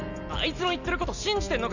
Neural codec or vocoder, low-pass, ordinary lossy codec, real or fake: none; 7.2 kHz; none; real